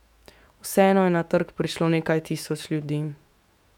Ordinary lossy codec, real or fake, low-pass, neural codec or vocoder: none; fake; 19.8 kHz; autoencoder, 48 kHz, 128 numbers a frame, DAC-VAE, trained on Japanese speech